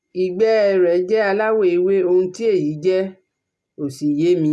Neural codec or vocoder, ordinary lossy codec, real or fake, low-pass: none; none; real; none